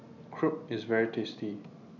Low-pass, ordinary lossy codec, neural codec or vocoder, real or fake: 7.2 kHz; none; none; real